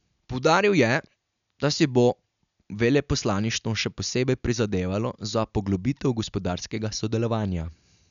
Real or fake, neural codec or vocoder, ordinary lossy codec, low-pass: real; none; none; 7.2 kHz